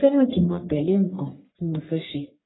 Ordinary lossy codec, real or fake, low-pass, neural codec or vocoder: AAC, 16 kbps; fake; 7.2 kHz; codec, 44.1 kHz, 1.7 kbps, Pupu-Codec